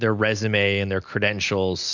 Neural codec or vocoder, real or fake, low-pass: none; real; 7.2 kHz